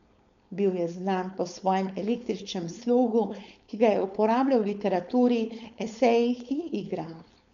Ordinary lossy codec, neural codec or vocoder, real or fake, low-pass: MP3, 96 kbps; codec, 16 kHz, 4.8 kbps, FACodec; fake; 7.2 kHz